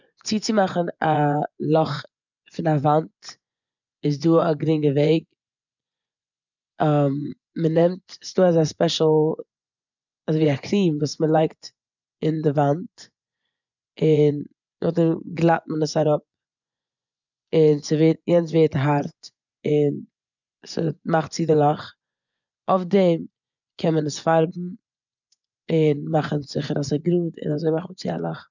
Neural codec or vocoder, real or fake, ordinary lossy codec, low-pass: vocoder, 24 kHz, 100 mel bands, Vocos; fake; none; 7.2 kHz